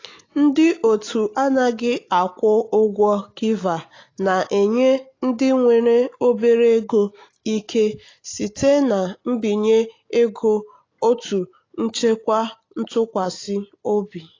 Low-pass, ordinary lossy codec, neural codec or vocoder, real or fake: 7.2 kHz; AAC, 32 kbps; none; real